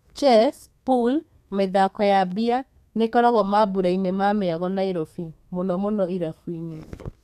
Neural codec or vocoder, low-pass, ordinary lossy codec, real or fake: codec, 32 kHz, 1.9 kbps, SNAC; 14.4 kHz; none; fake